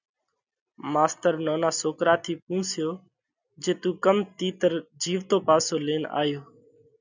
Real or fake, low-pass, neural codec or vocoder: real; 7.2 kHz; none